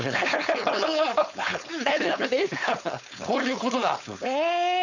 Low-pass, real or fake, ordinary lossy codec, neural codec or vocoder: 7.2 kHz; fake; none; codec, 16 kHz, 4.8 kbps, FACodec